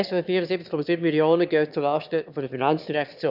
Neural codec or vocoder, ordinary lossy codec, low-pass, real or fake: autoencoder, 22.05 kHz, a latent of 192 numbers a frame, VITS, trained on one speaker; none; 5.4 kHz; fake